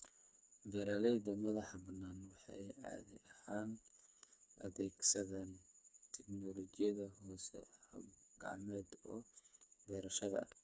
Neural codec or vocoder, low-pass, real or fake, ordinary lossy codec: codec, 16 kHz, 4 kbps, FreqCodec, smaller model; none; fake; none